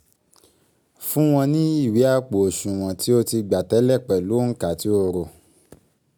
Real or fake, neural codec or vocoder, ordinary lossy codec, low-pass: real; none; none; none